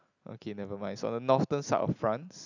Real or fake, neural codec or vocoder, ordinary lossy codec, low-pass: real; none; none; 7.2 kHz